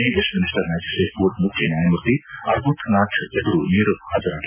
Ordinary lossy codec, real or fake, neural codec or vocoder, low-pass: none; real; none; 3.6 kHz